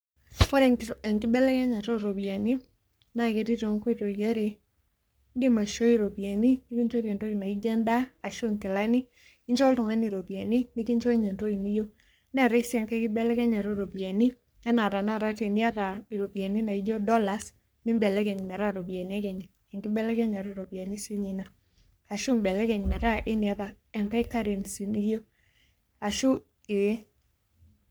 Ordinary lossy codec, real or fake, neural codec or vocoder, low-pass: none; fake; codec, 44.1 kHz, 3.4 kbps, Pupu-Codec; none